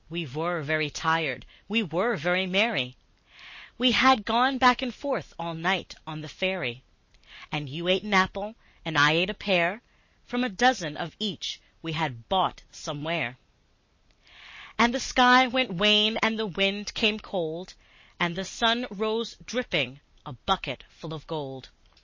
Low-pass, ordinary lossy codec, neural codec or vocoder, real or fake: 7.2 kHz; MP3, 32 kbps; none; real